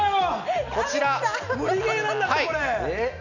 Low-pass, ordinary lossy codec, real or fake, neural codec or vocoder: 7.2 kHz; none; real; none